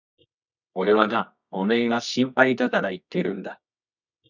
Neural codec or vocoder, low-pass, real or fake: codec, 24 kHz, 0.9 kbps, WavTokenizer, medium music audio release; 7.2 kHz; fake